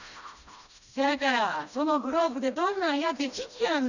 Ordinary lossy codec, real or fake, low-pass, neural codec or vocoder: none; fake; 7.2 kHz; codec, 16 kHz, 1 kbps, FreqCodec, smaller model